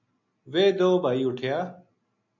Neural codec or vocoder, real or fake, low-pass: none; real; 7.2 kHz